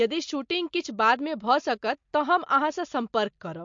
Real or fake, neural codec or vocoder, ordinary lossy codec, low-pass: real; none; MP3, 48 kbps; 7.2 kHz